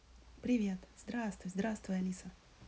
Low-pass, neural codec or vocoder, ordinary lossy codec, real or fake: none; none; none; real